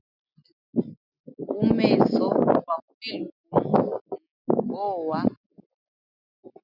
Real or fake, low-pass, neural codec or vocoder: real; 5.4 kHz; none